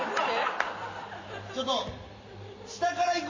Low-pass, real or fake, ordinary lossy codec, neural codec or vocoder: 7.2 kHz; real; MP3, 32 kbps; none